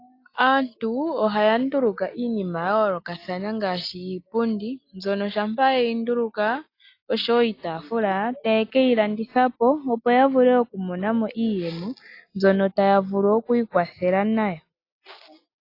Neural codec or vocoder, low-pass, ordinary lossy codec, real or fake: none; 5.4 kHz; AAC, 32 kbps; real